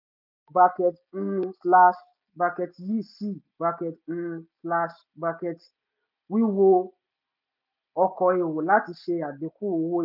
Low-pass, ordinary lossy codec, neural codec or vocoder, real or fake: 5.4 kHz; none; none; real